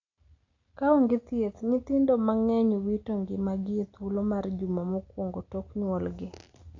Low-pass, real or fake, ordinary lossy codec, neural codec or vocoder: 7.2 kHz; real; AAC, 48 kbps; none